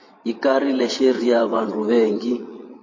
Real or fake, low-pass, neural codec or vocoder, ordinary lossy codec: fake; 7.2 kHz; vocoder, 22.05 kHz, 80 mel bands, WaveNeXt; MP3, 32 kbps